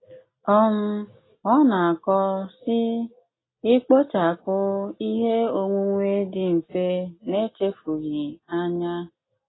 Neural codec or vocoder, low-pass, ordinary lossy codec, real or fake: none; 7.2 kHz; AAC, 16 kbps; real